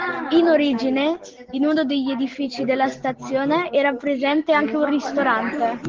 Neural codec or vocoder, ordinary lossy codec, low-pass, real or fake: none; Opus, 16 kbps; 7.2 kHz; real